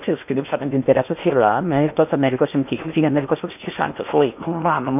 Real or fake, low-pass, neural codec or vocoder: fake; 3.6 kHz; codec, 16 kHz in and 24 kHz out, 0.8 kbps, FocalCodec, streaming, 65536 codes